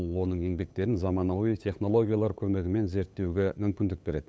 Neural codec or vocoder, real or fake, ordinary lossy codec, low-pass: codec, 16 kHz, 8 kbps, FunCodec, trained on LibriTTS, 25 frames a second; fake; none; none